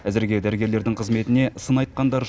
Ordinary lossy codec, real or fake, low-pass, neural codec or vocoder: none; real; none; none